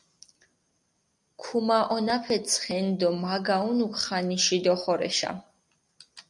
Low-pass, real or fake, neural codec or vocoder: 10.8 kHz; real; none